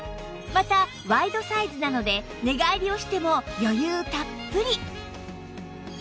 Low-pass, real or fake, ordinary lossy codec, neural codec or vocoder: none; real; none; none